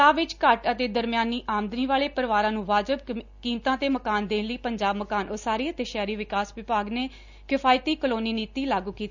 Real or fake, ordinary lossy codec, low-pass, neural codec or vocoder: real; none; 7.2 kHz; none